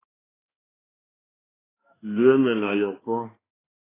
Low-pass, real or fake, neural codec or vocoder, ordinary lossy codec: 3.6 kHz; fake; codec, 16 kHz, 2 kbps, X-Codec, HuBERT features, trained on balanced general audio; AAC, 16 kbps